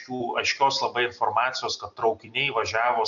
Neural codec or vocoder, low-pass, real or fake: none; 7.2 kHz; real